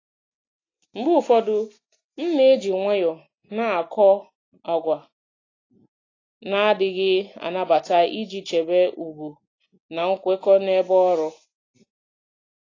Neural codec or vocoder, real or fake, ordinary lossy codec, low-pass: none; real; AAC, 32 kbps; 7.2 kHz